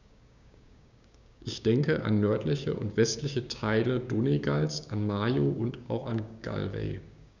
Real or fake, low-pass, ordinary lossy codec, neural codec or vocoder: fake; 7.2 kHz; none; codec, 16 kHz, 6 kbps, DAC